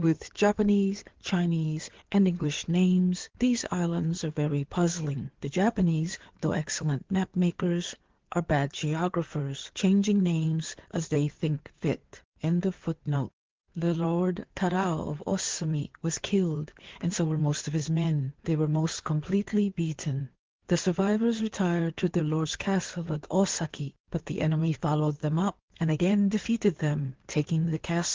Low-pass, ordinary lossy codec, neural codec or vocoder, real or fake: 7.2 kHz; Opus, 16 kbps; codec, 16 kHz in and 24 kHz out, 2.2 kbps, FireRedTTS-2 codec; fake